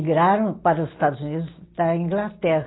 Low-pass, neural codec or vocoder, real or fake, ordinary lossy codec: 7.2 kHz; none; real; AAC, 16 kbps